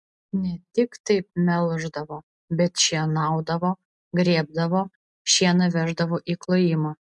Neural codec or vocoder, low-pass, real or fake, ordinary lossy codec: none; 10.8 kHz; real; MP3, 64 kbps